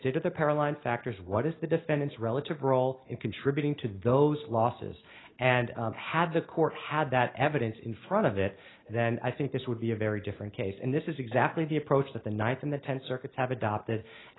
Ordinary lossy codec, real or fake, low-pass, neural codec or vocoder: AAC, 16 kbps; real; 7.2 kHz; none